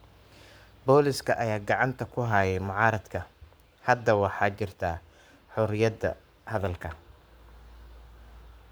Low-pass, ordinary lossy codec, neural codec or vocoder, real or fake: none; none; codec, 44.1 kHz, 7.8 kbps, Pupu-Codec; fake